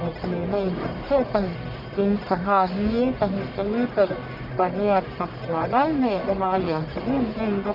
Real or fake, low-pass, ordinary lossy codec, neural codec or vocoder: fake; 5.4 kHz; none; codec, 44.1 kHz, 1.7 kbps, Pupu-Codec